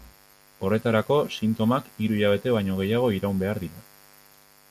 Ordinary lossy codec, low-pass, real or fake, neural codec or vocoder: MP3, 96 kbps; 14.4 kHz; real; none